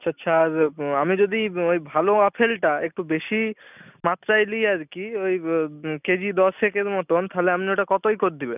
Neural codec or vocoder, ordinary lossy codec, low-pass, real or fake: none; none; 3.6 kHz; real